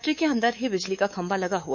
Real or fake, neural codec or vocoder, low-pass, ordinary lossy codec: fake; codec, 24 kHz, 3.1 kbps, DualCodec; 7.2 kHz; none